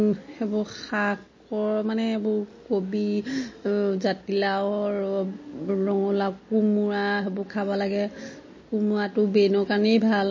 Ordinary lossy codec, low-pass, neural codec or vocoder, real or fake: MP3, 32 kbps; 7.2 kHz; none; real